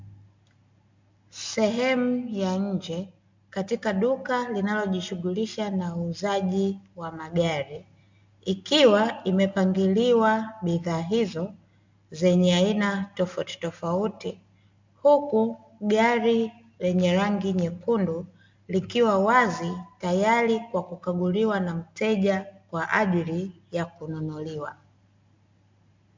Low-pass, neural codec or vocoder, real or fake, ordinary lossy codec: 7.2 kHz; none; real; MP3, 64 kbps